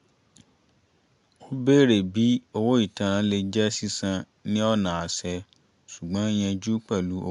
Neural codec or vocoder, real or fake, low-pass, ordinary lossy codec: none; real; 10.8 kHz; AAC, 96 kbps